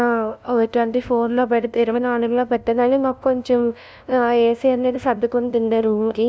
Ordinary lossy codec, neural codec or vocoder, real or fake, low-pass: none; codec, 16 kHz, 0.5 kbps, FunCodec, trained on LibriTTS, 25 frames a second; fake; none